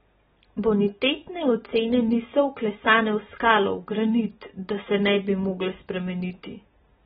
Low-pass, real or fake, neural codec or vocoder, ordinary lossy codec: 19.8 kHz; real; none; AAC, 16 kbps